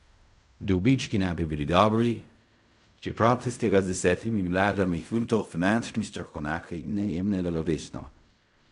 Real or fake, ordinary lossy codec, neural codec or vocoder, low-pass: fake; none; codec, 16 kHz in and 24 kHz out, 0.4 kbps, LongCat-Audio-Codec, fine tuned four codebook decoder; 10.8 kHz